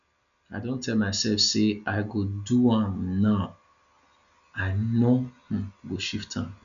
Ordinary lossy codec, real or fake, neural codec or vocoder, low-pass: none; real; none; 7.2 kHz